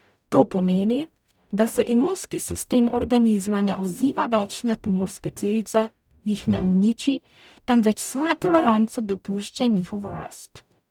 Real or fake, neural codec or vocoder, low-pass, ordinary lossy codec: fake; codec, 44.1 kHz, 0.9 kbps, DAC; 19.8 kHz; none